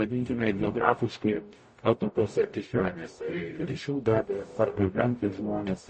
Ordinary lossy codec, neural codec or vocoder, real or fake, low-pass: MP3, 32 kbps; codec, 44.1 kHz, 0.9 kbps, DAC; fake; 9.9 kHz